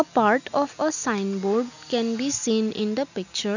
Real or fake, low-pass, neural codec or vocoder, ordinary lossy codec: real; 7.2 kHz; none; none